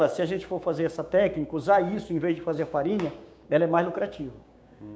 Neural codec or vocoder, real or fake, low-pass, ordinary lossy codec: codec, 16 kHz, 6 kbps, DAC; fake; none; none